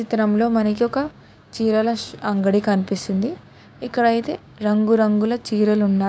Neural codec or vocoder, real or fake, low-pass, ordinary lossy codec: codec, 16 kHz, 6 kbps, DAC; fake; none; none